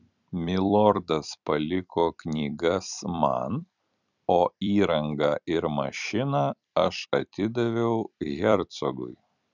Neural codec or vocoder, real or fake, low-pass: vocoder, 44.1 kHz, 128 mel bands every 256 samples, BigVGAN v2; fake; 7.2 kHz